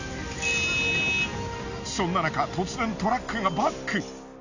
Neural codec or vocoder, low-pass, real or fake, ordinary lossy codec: none; 7.2 kHz; real; none